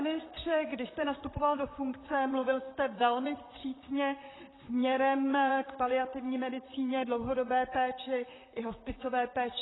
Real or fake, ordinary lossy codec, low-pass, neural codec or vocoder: fake; AAC, 16 kbps; 7.2 kHz; vocoder, 44.1 kHz, 128 mel bands, Pupu-Vocoder